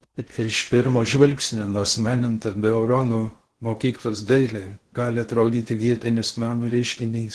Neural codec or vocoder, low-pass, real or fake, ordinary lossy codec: codec, 16 kHz in and 24 kHz out, 0.6 kbps, FocalCodec, streaming, 4096 codes; 10.8 kHz; fake; Opus, 16 kbps